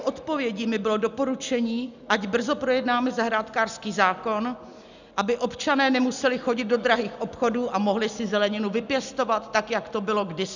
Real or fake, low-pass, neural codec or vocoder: real; 7.2 kHz; none